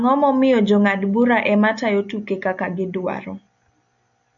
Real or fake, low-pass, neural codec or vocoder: real; 7.2 kHz; none